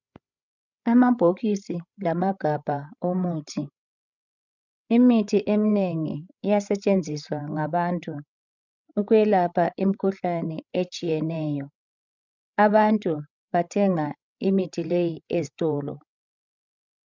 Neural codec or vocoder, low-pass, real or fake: codec, 16 kHz, 16 kbps, FreqCodec, larger model; 7.2 kHz; fake